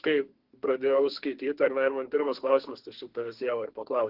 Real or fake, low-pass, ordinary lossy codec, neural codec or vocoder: fake; 5.4 kHz; Opus, 16 kbps; codec, 32 kHz, 1.9 kbps, SNAC